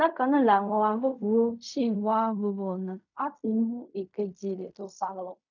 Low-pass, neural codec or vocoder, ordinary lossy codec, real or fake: 7.2 kHz; codec, 16 kHz in and 24 kHz out, 0.4 kbps, LongCat-Audio-Codec, fine tuned four codebook decoder; none; fake